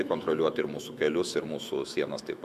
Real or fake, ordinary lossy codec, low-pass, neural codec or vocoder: fake; Opus, 64 kbps; 14.4 kHz; autoencoder, 48 kHz, 128 numbers a frame, DAC-VAE, trained on Japanese speech